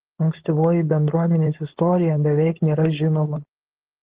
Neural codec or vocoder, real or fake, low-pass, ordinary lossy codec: codec, 16 kHz, 4.8 kbps, FACodec; fake; 3.6 kHz; Opus, 16 kbps